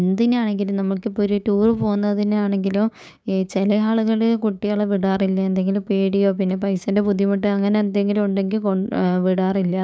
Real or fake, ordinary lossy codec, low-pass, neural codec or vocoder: fake; none; none; codec, 16 kHz, 6 kbps, DAC